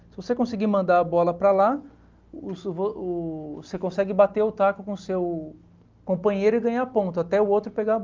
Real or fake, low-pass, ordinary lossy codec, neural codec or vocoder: real; 7.2 kHz; Opus, 32 kbps; none